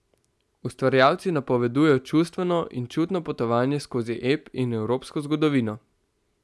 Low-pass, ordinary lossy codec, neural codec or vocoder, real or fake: none; none; none; real